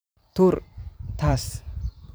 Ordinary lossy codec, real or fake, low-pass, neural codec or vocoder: none; fake; none; vocoder, 44.1 kHz, 128 mel bands every 512 samples, BigVGAN v2